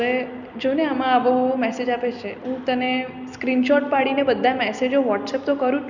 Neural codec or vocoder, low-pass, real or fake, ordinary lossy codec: none; 7.2 kHz; real; none